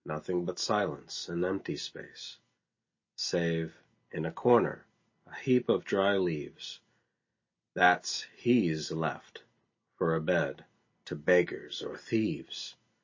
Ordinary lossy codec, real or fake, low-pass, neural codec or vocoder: MP3, 32 kbps; real; 7.2 kHz; none